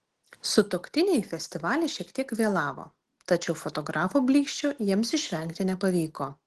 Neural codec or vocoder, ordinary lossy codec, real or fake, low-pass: none; Opus, 16 kbps; real; 14.4 kHz